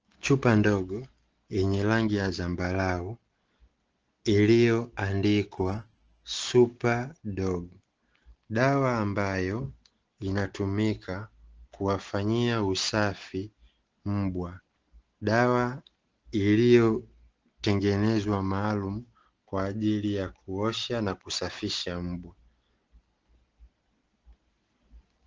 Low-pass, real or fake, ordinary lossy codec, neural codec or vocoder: 7.2 kHz; real; Opus, 16 kbps; none